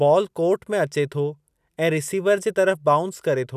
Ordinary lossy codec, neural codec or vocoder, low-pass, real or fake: none; none; 14.4 kHz; real